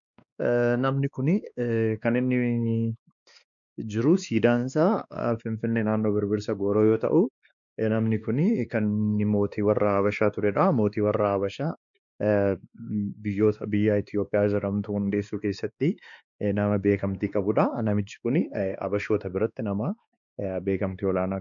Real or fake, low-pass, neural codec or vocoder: fake; 7.2 kHz; codec, 16 kHz, 2 kbps, X-Codec, WavLM features, trained on Multilingual LibriSpeech